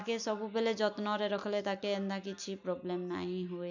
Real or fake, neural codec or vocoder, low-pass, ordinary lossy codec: real; none; 7.2 kHz; none